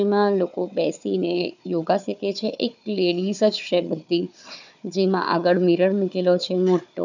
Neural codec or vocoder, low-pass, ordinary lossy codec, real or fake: codec, 16 kHz, 4 kbps, FunCodec, trained on Chinese and English, 50 frames a second; 7.2 kHz; none; fake